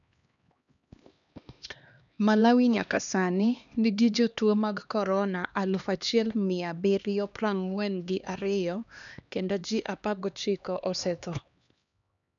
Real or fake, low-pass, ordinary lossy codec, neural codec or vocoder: fake; 7.2 kHz; none; codec, 16 kHz, 2 kbps, X-Codec, HuBERT features, trained on LibriSpeech